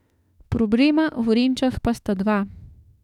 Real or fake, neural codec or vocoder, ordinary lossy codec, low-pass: fake; autoencoder, 48 kHz, 32 numbers a frame, DAC-VAE, trained on Japanese speech; none; 19.8 kHz